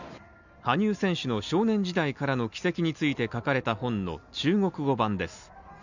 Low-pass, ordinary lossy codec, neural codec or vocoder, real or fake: 7.2 kHz; none; none; real